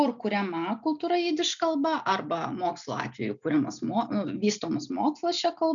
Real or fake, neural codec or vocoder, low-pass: real; none; 7.2 kHz